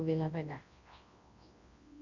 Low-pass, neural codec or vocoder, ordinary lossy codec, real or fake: 7.2 kHz; codec, 24 kHz, 0.9 kbps, WavTokenizer, large speech release; Opus, 64 kbps; fake